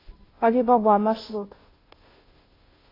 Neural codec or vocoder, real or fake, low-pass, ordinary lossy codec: codec, 16 kHz, 0.5 kbps, FunCodec, trained on Chinese and English, 25 frames a second; fake; 5.4 kHz; AAC, 24 kbps